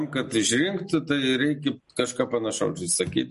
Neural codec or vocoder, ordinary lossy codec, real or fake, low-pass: none; MP3, 48 kbps; real; 14.4 kHz